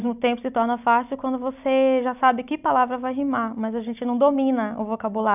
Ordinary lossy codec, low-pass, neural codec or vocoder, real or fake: none; 3.6 kHz; none; real